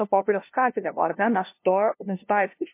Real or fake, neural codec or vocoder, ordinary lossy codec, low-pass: fake; codec, 16 kHz, 0.5 kbps, FunCodec, trained on LibriTTS, 25 frames a second; MP3, 24 kbps; 3.6 kHz